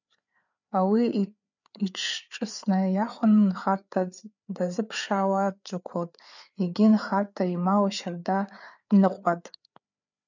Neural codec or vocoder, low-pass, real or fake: codec, 16 kHz, 4 kbps, FreqCodec, larger model; 7.2 kHz; fake